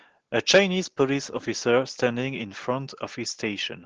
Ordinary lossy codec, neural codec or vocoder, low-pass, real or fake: Opus, 16 kbps; none; 7.2 kHz; real